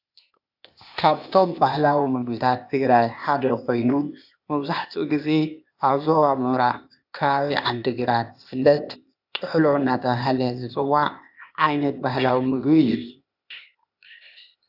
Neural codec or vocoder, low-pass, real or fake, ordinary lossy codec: codec, 16 kHz, 0.8 kbps, ZipCodec; 5.4 kHz; fake; AAC, 48 kbps